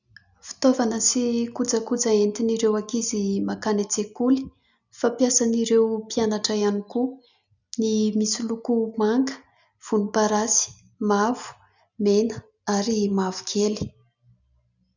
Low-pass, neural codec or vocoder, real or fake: 7.2 kHz; none; real